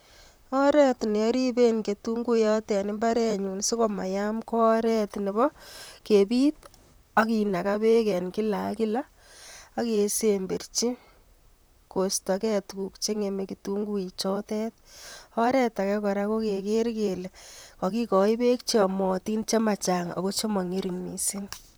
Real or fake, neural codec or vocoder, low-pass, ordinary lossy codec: fake; vocoder, 44.1 kHz, 128 mel bands, Pupu-Vocoder; none; none